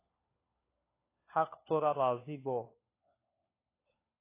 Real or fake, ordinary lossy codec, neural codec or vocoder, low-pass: fake; AAC, 24 kbps; codec, 16 kHz, 4 kbps, FunCodec, trained on LibriTTS, 50 frames a second; 3.6 kHz